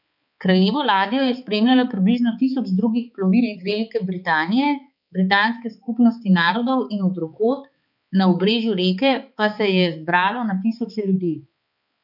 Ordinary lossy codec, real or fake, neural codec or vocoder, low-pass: none; fake; codec, 16 kHz, 4 kbps, X-Codec, HuBERT features, trained on balanced general audio; 5.4 kHz